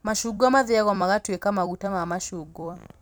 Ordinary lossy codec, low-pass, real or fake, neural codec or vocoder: none; none; fake; vocoder, 44.1 kHz, 128 mel bands every 256 samples, BigVGAN v2